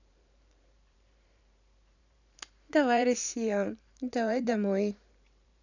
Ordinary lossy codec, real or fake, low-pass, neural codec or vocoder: none; fake; 7.2 kHz; vocoder, 44.1 kHz, 128 mel bands every 512 samples, BigVGAN v2